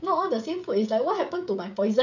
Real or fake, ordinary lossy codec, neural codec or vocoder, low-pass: fake; none; codec, 16 kHz, 16 kbps, FreqCodec, smaller model; 7.2 kHz